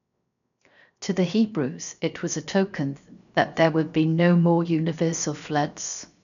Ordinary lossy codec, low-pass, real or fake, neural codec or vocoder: none; 7.2 kHz; fake; codec, 16 kHz, 0.7 kbps, FocalCodec